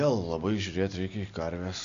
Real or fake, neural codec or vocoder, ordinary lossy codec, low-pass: real; none; MP3, 64 kbps; 7.2 kHz